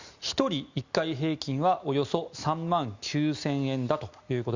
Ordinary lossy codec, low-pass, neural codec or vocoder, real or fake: Opus, 64 kbps; 7.2 kHz; none; real